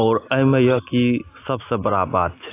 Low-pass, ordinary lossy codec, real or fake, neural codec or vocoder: 3.6 kHz; AAC, 24 kbps; real; none